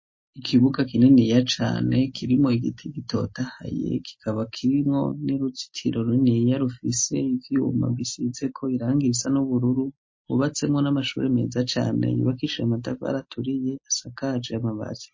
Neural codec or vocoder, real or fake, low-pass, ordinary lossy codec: none; real; 7.2 kHz; MP3, 32 kbps